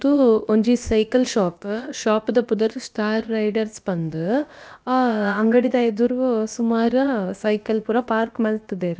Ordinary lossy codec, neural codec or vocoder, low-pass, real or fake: none; codec, 16 kHz, about 1 kbps, DyCAST, with the encoder's durations; none; fake